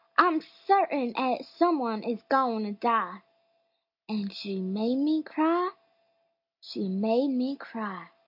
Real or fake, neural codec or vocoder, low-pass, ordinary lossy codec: real; none; 5.4 kHz; AAC, 48 kbps